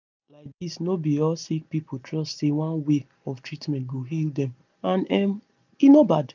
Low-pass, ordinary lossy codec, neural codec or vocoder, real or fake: 7.2 kHz; none; none; real